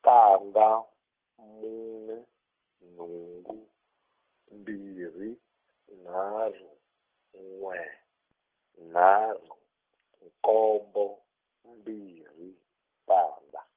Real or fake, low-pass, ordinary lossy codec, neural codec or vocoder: real; 3.6 kHz; Opus, 16 kbps; none